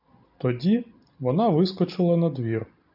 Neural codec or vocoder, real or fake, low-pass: none; real; 5.4 kHz